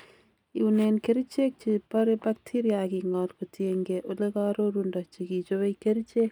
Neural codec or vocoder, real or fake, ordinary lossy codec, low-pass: none; real; none; 19.8 kHz